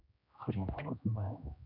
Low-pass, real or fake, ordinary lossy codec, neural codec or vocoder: 5.4 kHz; fake; none; codec, 16 kHz, 1 kbps, X-Codec, HuBERT features, trained on general audio